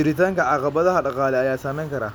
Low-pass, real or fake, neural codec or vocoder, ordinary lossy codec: none; real; none; none